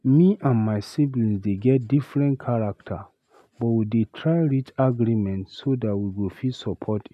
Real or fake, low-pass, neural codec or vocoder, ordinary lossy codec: real; 14.4 kHz; none; none